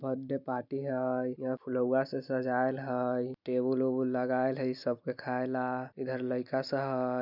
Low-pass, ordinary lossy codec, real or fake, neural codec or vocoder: 5.4 kHz; none; real; none